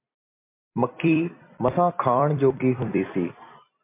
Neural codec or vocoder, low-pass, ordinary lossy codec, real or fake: vocoder, 44.1 kHz, 128 mel bands every 256 samples, BigVGAN v2; 3.6 kHz; MP3, 24 kbps; fake